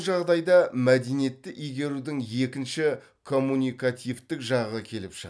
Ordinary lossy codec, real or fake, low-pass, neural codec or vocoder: none; real; none; none